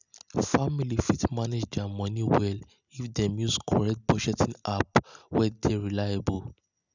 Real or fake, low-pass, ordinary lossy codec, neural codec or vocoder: real; 7.2 kHz; none; none